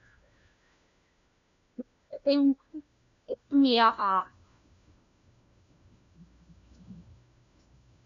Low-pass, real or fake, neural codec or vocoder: 7.2 kHz; fake; codec, 16 kHz, 1 kbps, FunCodec, trained on LibriTTS, 50 frames a second